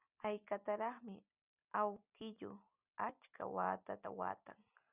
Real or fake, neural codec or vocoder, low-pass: real; none; 3.6 kHz